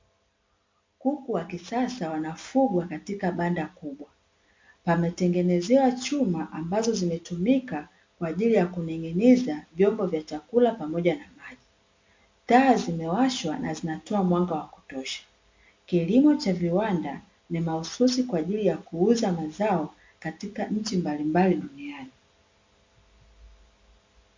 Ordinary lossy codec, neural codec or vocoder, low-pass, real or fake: MP3, 48 kbps; none; 7.2 kHz; real